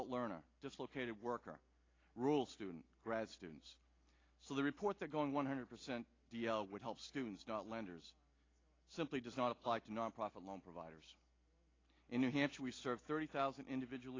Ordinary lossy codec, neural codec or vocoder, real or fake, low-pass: AAC, 32 kbps; none; real; 7.2 kHz